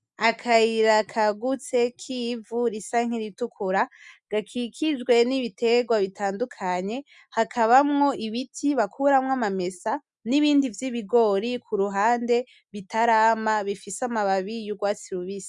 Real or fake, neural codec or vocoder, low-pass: real; none; 10.8 kHz